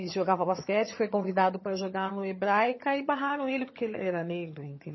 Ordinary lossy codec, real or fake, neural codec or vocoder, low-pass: MP3, 24 kbps; fake; vocoder, 22.05 kHz, 80 mel bands, HiFi-GAN; 7.2 kHz